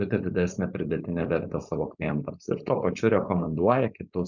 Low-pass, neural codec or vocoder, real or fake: 7.2 kHz; codec, 16 kHz, 4.8 kbps, FACodec; fake